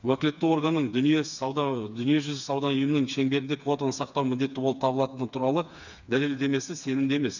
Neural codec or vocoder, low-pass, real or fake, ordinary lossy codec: codec, 16 kHz, 4 kbps, FreqCodec, smaller model; 7.2 kHz; fake; none